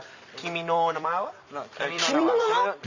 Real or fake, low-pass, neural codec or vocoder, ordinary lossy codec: fake; 7.2 kHz; vocoder, 44.1 kHz, 128 mel bands every 256 samples, BigVGAN v2; Opus, 64 kbps